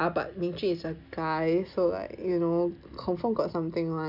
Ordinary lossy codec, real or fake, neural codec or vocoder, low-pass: none; real; none; 5.4 kHz